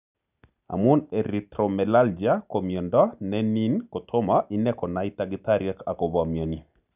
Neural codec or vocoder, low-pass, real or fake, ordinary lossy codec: none; 3.6 kHz; real; none